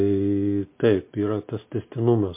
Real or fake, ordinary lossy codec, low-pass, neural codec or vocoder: real; MP3, 24 kbps; 3.6 kHz; none